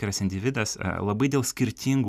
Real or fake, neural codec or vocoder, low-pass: real; none; 14.4 kHz